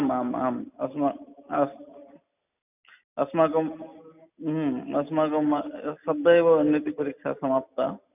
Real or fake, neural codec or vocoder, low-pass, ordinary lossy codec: real; none; 3.6 kHz; none